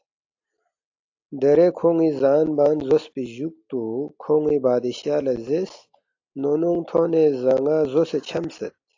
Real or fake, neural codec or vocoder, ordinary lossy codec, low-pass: real; none; AAC, 48 kbps; 7.2 kHz